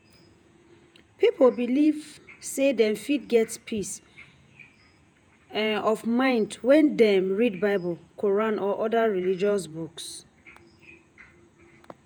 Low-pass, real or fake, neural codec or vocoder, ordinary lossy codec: 19.8 kHz; fake; vocoder, 48 kHz, 128 mel bands, Vocos; none